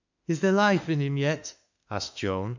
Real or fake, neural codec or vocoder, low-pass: fake; autoencoder, 48 kHz, 32 numbers a frame, DAC-VAE, trained on Japanese speech; 7.2 kHz